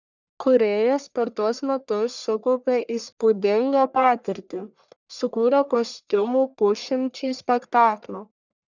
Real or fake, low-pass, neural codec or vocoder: fake; 7.2 kHz; codec, 44.1 kHz, 1.7 kbps, Pupu-Codec